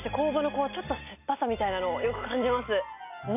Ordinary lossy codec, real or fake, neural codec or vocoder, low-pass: none; real; none; 3.6 kHz